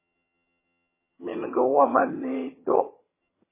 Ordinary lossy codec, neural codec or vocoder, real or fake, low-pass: MP3, 16 kbps; vocoder, 22.05 kHz, 80 mel bands, HiFi-GAN; fake; 3.6 kHz